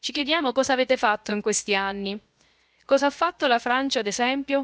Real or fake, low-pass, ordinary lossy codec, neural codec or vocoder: fake; none; none; codec, 16 kHz, 0.7 kbps, FocalCodec